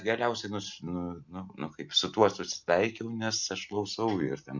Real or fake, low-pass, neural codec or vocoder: real; 7.2 kHz; none